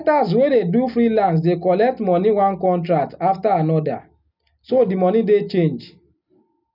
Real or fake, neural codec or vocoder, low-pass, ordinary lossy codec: real; none; 5.4 kHz; none